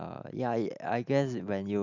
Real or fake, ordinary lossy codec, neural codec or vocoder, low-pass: real; none; none; 7.2 kHz